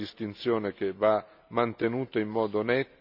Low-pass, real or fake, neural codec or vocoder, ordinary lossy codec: 5.4 kHz; real; none; none